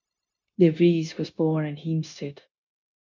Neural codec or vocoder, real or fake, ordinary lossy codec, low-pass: codec, 16 kHz, 0.9 kbps, LongCat-Audio-Codec; fake; MP3, 64 kbps; 7.2 kHz